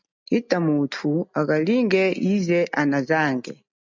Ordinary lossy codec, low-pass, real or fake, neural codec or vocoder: MP3, 64 kbps; 7.2 kHz; real; none